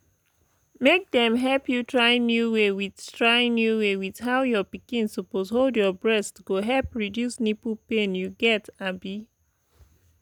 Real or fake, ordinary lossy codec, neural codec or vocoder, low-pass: real; none; none; 19.8 kHz